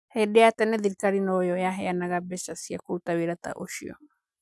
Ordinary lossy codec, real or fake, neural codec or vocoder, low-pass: none; real; none; none